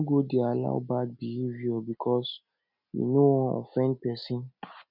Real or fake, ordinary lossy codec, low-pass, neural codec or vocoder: real; none; 5.4 kHz; none